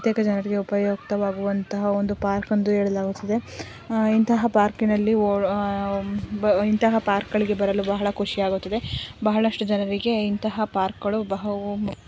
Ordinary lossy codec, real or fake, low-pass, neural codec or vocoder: none; real; none; none